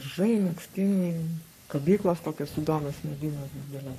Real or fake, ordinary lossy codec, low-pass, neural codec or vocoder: fake; MP3, 64 kbps; 14.4 kHz; codec, 44.1 kHz, 3.4 kbps, Pupu-Codec